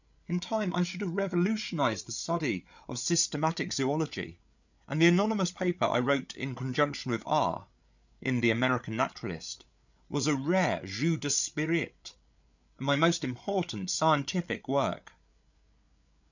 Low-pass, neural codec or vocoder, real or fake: 7.2 kHz; codec, 16 kHz, 8 kbps, FreqCodec, larger model; fake